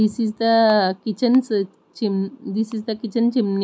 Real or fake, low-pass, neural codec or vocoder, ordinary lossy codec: real; none; none; none